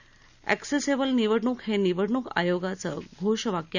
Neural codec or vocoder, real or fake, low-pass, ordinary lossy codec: none; real; 7.2 kHz; none